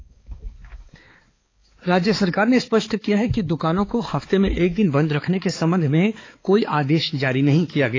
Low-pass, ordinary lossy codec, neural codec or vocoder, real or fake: 7.2 kHz; AAC, 32 kbps; codec, 16 kHz, 4 kbps, X-Codec, HuBERT features, trained on balanced general audio; fake